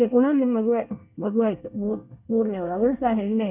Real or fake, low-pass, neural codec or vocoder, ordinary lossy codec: fake; 3.6 kHz; codec, 24 kHz, 1 kbps, SNAC; none